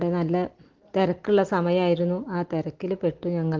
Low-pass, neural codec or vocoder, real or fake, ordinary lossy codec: 7.2 kHz; none; real; Opus, 16 kbps